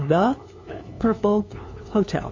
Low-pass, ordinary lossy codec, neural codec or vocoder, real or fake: 7.2 kHz; MP3, 32 kbps; codec, 16 kHz, 2 kbps, FunCodec, trained on LibriTTS, 25 frames a second; fake